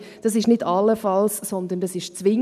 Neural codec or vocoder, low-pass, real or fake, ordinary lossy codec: none; 14.4 kHz; real; none